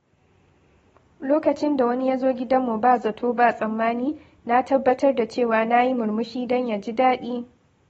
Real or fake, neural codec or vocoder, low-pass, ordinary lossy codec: real; none; 19.8 kHz; AAC, 24 kbps